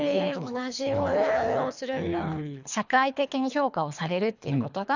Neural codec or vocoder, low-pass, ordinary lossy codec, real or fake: codec, 24 kHz, 3 kbps, HILCodec; 7.2 kHz; none; fake